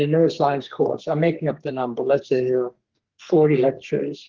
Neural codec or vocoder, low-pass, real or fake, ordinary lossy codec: codec, 32 kHz, 1.9 kbps, SNAC; 7.2 kHz; fake; Opus, 16 kbps